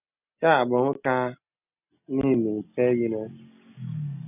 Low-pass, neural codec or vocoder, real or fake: 3.6 kHz; none; real